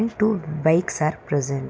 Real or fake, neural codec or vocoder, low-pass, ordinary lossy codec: real; none; none; none